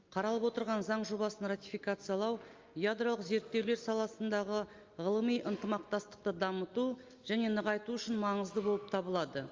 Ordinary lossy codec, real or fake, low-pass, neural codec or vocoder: Opus, 32 kbps; real; 7.2 kHz; none